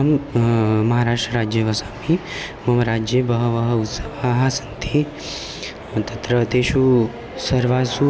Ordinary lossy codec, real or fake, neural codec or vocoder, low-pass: none; real; none; none